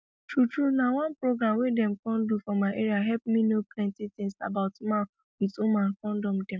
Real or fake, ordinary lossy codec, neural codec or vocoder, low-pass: real; none; none; none